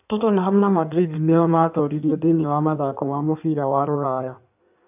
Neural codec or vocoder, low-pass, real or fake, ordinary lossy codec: codec, 16 kHz in and 24 kHz out, 1.1 kbps, FireRedTTS-2 codec; 3.6 kHz; fake; AAC, 32 kbps